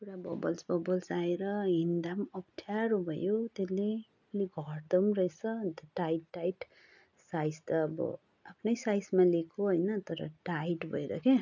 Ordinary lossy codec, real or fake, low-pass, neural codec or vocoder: none; real; 7.2 kHz; none